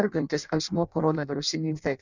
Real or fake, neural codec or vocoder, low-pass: fake; codec, 16 kHz in and 24 kHz out, 0.6 kbps, FireRedTTS-2 codec; 7.2 kHz